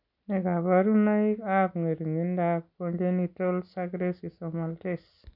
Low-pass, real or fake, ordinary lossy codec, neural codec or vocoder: 5.4 kHz; real; none; none